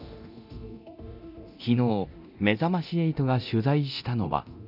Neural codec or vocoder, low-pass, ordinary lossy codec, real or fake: codec, 24 kHz, 0.9 kbps, DualCodec; 5.4 kHz; none; fake